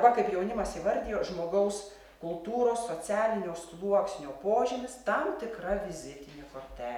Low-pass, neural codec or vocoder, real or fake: 19.8 kHz; none; real